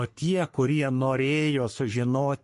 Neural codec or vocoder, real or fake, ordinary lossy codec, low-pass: codec, 44.1 kHz, 3.4 kbps, Pupu-Codec; fake; MP3, 48 kbps; 14.4 kHz